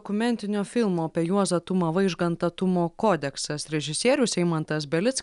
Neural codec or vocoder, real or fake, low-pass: none; real; 10.8 kHz